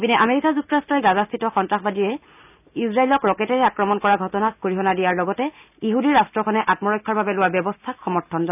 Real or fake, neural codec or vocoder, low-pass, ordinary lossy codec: real; none; 3.6 kHz; none